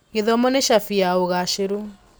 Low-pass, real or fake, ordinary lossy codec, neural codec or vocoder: none; real; none; none